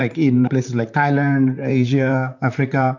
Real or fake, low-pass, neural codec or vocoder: fake; 7.2 kHz; vocoder, 44.1 kHz, 128 mel bands, Pupu-Vocoder